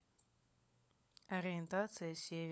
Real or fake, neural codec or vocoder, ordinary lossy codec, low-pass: fake; codec, 16 kHz, 16 kbps, FunCodec, trained on LibriTTS, 50 frames a second; none; none